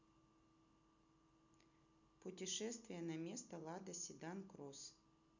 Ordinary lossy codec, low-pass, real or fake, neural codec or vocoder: none; 7.2 kHz; real; none